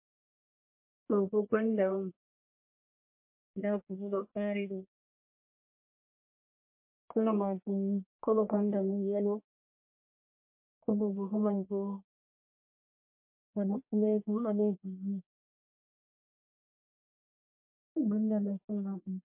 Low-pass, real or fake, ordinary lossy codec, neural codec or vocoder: 3.6 kHz; fake; MP3, 24 kbps; codec, 44.1 kHz, 1.7 kbps, Pupu-Codec